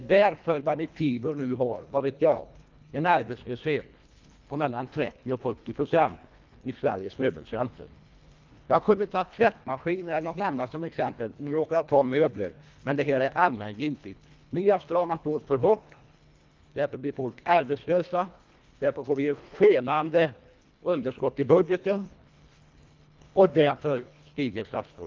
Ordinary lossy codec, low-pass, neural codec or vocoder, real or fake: Opus, 24 kbps; 7.2 kHz; codec, 24 kHz, 1.5 kbps, HILCodec; fake